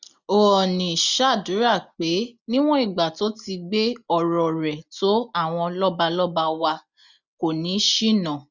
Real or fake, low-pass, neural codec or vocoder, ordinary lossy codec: real; 7.2 kHz; none; none